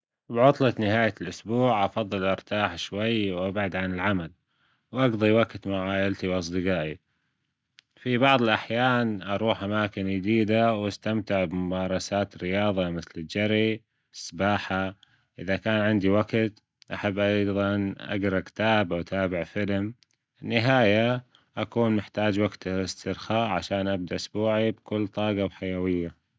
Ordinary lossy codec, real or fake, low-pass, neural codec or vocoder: none; real; none; none